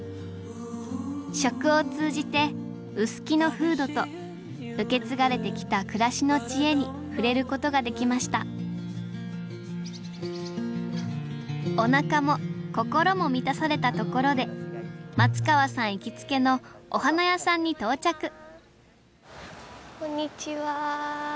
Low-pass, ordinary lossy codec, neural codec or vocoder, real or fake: none; none; none; real